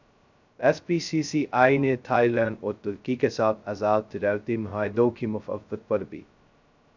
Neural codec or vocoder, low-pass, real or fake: codec, 16 kHz, 0.2 kbps, FocalCodec; 7.2 kHz; fake